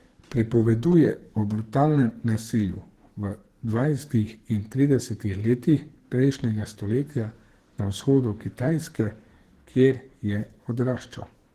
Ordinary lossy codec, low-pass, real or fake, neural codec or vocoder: Opus, 16 kbps; 14.4 kHz; fake; codec, 44.1 kHz, 2.6 kbps, SNAC